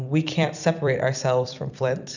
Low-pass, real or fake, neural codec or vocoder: 7.2 kHz; fake; vocoder, 44.1 kHz, 128 mel bands every 256 samples, BigVGAN v2